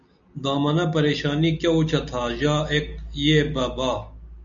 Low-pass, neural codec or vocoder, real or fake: 7.2 kHz; none; real